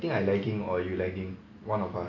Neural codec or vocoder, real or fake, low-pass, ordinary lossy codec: none; real; 7.2 kHz; none